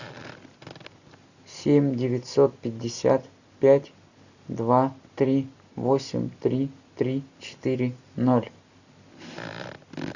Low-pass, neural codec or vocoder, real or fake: 7.2 kHz; none; real